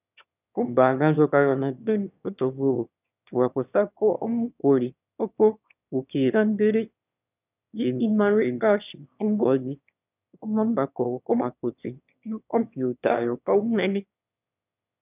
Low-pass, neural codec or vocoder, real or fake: 3.6 kHz; autoencoder, 22.05 kHz, a latent of 192 numbers a frame, VITS, trained on one speaker; fake